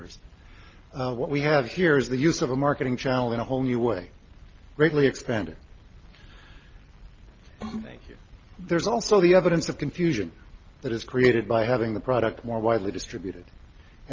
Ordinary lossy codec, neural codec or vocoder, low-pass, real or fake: Opus, 32 kbps; none; 7.2 kHz; real